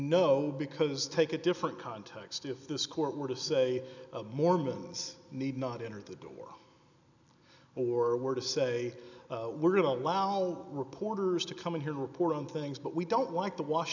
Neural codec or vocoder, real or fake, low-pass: none; real; 7.2 kHz